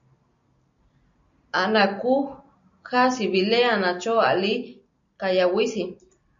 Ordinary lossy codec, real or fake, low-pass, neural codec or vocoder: MP3, 64 kbps; real; 7.2 kHz; none